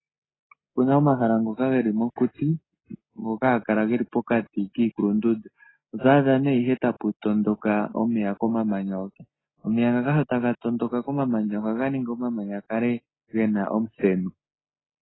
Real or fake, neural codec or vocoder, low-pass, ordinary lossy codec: real; none; 7.2 kHz; AAC, 16 kbps